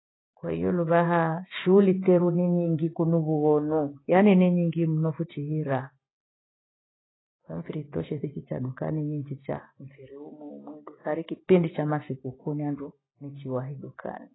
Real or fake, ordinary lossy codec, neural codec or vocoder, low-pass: real; AAC, 16 kbps; none; 7.2 kHz